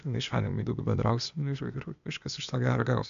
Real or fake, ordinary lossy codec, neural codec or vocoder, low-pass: fake; AAC, 96 kbps; codec, 16 kHz, 0.8 kbps, ZipCodec; 7.2 kHz